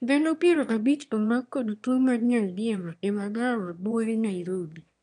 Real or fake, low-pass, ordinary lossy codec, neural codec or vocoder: fake; 9.9 kHz; none; autoencoder, 22.05 kHz, a latent of 192 numbers a frame, VITS, trained on one speaker